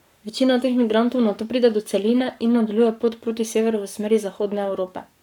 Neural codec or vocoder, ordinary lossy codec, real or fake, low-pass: codec, 44.1 kHz, 7.8 kbps, Pupu-Codec; none; fake; 19.8 kHz